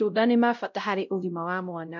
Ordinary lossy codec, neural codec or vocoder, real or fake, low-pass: none; codec, 16 kHz, 0.5 kbps, X-Codec, WavLM features, trained on Multilingual LibriSpeech; fake; 7.2 kHz